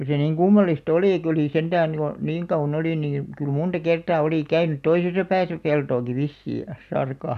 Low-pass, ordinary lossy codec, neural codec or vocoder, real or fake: 14.4 kHz; none; none; real